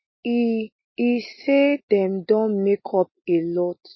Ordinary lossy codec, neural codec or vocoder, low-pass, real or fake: MP3, 24 kbps; none; 7.2 kHz; real